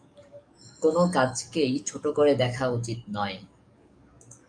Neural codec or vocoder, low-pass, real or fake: codec, 44.1 kHz, 7.8 kbps, DAC; 9.9 kHz; fake